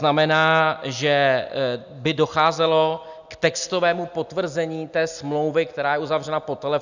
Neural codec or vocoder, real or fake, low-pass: none; real; 7.2 kHz